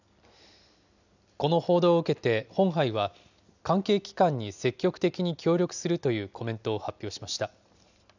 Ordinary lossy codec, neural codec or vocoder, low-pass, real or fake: none; none; 7.2 kHz; real